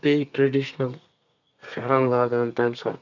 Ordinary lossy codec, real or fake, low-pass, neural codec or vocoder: none; fake; 7.2 kHz; codec, 32 kHz, 1.9 kbps, SNAC